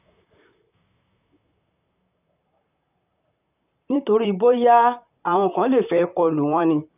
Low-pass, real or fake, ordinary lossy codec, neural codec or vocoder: 3.6 kHz; fake; none; vocoder, 44.1 kHz, 128 mel bands, Pupu-Vocoder